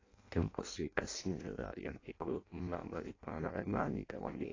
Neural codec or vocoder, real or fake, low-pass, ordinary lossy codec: codec, 16 kHz in and 24 kHz out, 0.6 kbps, FireRedTTS-2 codec; fake; 7.2 kHz; AAC, 32 kbps